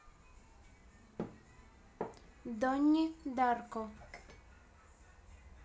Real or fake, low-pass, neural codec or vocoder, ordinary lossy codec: real; none; none; none